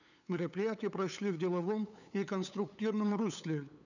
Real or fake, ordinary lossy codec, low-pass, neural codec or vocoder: fake; none; 7.2 kHz; codec, 16 kHz, 8 kbps, FunCodec, trained on LibriTTS, 25 frames a second